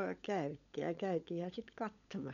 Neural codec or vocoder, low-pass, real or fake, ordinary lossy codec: codec, 16 kHz, 16 kbps, FunCodec, trained on LibriTTS, 50 frames a second; 7.2 kHz; fake; none